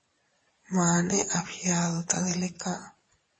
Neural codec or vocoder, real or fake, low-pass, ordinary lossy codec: vocoder, 22.05 kHz, 80 mel bands, Vocos; fake; 9.9 kHz; MP3, 32 kbps